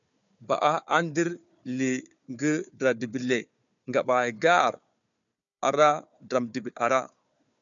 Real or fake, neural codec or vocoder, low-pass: fake; codec, 16 kHz, 4 kbps, FunCodec, trained on Chinese and English, 50 frames a second; 7.2 kHz